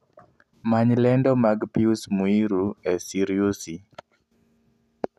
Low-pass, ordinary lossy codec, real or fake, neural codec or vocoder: 14.4 kHz; none; real; none